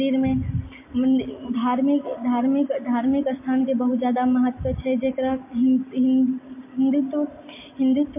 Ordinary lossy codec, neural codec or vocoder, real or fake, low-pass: MP3, 24 kbps; none; real; 3.6 kHz